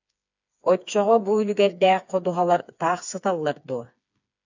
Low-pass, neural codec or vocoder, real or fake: 7.2 kHz; codec, 16 kHz, 4 kbps, FreqCodec, smaller model; fake